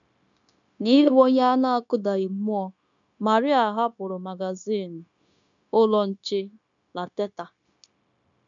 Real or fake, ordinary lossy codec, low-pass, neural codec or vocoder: fake; none; 7.2 kHz; codec, 16 kHz, 0.9 kbps, LongCat-Audio-Codec